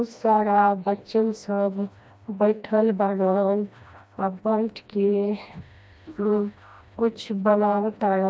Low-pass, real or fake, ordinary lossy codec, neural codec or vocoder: none; fake; none; codec, 16 kHz, 1 kbps, FreqCodec, smaller model